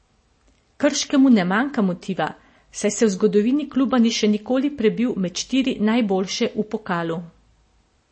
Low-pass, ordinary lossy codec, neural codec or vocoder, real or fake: 9.9 kHz; MP3, 32 kbps; none; real